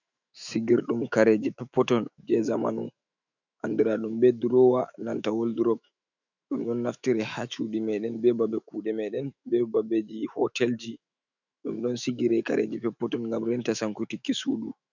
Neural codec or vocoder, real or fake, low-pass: autoencoder, 48 kHz, 128 numbers a frame, DAC-VAE, trained on Japanese speech; fake; 7.2 kHz